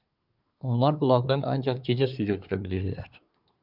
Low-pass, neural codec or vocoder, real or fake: 5.4 kHz; codec, 24 kHz, 1 kbps, SNAC; fake